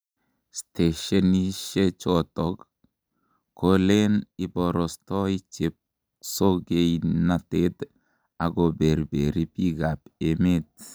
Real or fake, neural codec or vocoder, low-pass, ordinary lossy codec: real; none; none; none